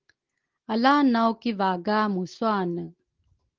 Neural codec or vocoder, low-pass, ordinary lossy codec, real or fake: none; 7.2 kHz; Opus, 16 kbps; real